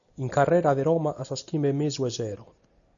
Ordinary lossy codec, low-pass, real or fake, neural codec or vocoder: AAC, 64 kbps; 7.2 kHz; real; none